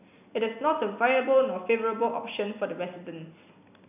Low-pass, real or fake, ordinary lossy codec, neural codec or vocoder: 3.6 kHz; real; none; none